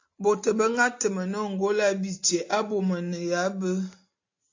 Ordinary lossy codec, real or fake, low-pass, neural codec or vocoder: AAC, 48 kbps; real; 7.2 kHz; none